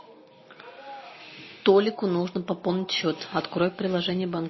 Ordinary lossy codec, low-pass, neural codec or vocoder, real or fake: MP3, 24 kbps; 7.2 kHz; none; real